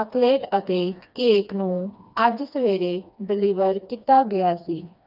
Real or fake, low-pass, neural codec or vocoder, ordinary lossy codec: fake; 5.4 kHz; codec, 16 kHz, 2 kbps, FreqCodec, smaller model; none